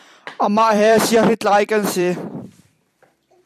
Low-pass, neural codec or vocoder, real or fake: 14.4 kHz; vocoder, 44.1 kHz, 128 mel bands every 256 samples, BigVGAN v2; fake